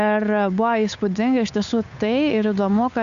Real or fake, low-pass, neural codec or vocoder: fake; 7.2 kHz; codec, 16 kHz, 8 kbps, FunCodec, trained on Chinese and English, 25 frames a second